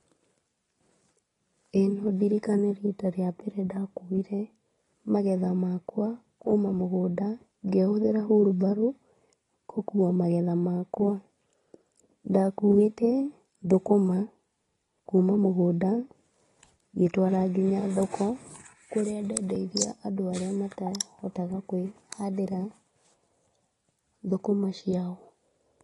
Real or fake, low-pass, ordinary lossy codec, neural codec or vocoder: real; 10.8 kHz; AAC, 32 kbps; none